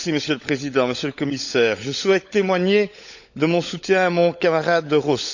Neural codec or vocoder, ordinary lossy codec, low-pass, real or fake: codec, 16 kHz, 16 kbps, FunCodec, trained on LibriTTS, 50 frames a second; none; 7.2 kHz; fake